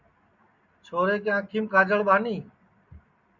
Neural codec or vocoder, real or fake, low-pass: none; real; 7.2 kHz